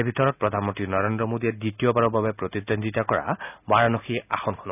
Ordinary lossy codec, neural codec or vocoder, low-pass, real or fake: none; none; 3.6 kHz; real